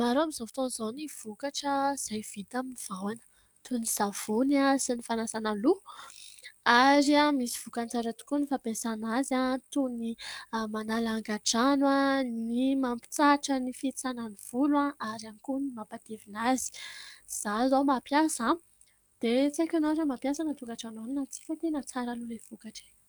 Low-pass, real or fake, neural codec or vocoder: 19.8 kHz; fake; codec, 44.1 kHz, 7.8 kbps, DAC